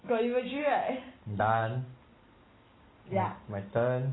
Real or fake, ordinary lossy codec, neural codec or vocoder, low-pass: real; AAC, 16 kbps; none; 7.2 kHz